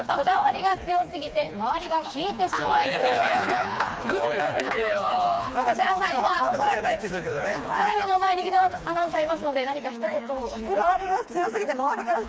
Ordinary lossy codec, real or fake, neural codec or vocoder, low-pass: none; fake; codec, 16 kHz, 2 kbps, FreqCodec, smaller model; none